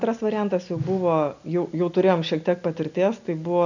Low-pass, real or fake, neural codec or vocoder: 7.2 kHz; real; none